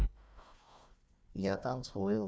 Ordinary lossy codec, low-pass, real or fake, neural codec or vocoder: none; none; fake; codec, 16 kHz, 1 kbps, FunCodec, trained on Chinese and English, 50 frames a second